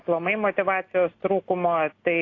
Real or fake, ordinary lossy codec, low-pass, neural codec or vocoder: real; AAC, 32 kbps; 7.2 kHz; none